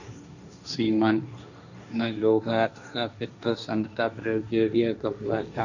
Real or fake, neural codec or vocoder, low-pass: fake; codec, 16 kHz, 1.1 kbps, Voila-Tokenizer; 7.2 kHz